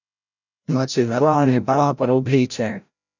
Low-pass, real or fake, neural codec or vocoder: 7.2 kHz; fake; codec, 16 kHz, 0.5 kbps, FreqCodec, larger model